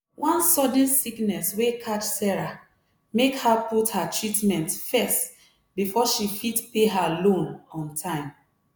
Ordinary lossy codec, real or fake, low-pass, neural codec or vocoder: none; real; none; none